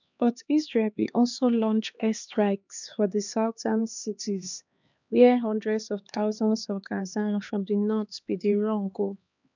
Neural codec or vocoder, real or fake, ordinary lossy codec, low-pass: codec, 16 kHz, 2 kbps, X-Codec, HuBERT features, trained on LibriSpeech; fake; none; 7.2 kHz